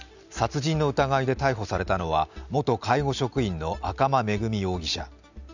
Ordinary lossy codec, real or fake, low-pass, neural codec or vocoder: none; real; 7.2 kHz; none